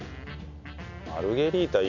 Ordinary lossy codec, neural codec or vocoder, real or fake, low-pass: none; none; real; 7.2 kHz